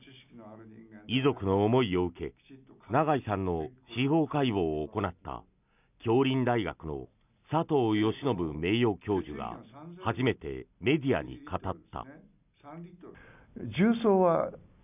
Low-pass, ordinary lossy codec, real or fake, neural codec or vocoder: 3.6 kHz; none; real; none